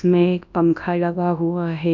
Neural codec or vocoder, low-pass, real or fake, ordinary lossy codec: codec, 24 kHz, 0.9 kbps, WavTokenizer, large speech release; 7.2 kHz; fake; none